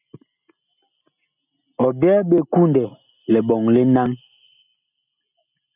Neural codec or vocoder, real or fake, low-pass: none; real; 3.6 kHz